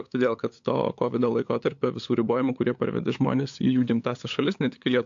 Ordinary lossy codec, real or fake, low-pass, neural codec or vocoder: AAC, 64 kbps; fake; 7.2 kHz; codec, 16 kHz, 8 kbps, FunCodec, trained on LibriTTS, 25 frames a second